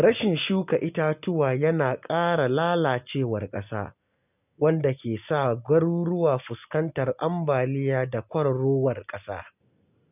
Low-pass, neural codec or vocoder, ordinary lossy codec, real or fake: 3.6 kHz; none; none; real